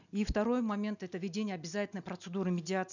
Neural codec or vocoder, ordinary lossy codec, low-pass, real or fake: none; none; 7.2 kHz; real